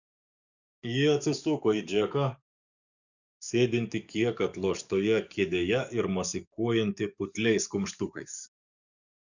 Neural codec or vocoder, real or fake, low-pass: codec, 44.1 kHz, 7.8 kbps, DAC; fake; 7.2 kHz